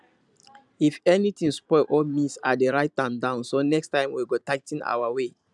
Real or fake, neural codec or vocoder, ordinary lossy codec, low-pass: fake; vocoder, 44.1 kHz, 128 mel bands every 512 samples, BigVGAN v2; none; 10.8 kHz